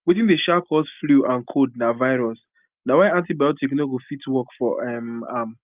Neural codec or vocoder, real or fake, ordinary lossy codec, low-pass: none; real; Opus, 32 kbps; 3.6 kHz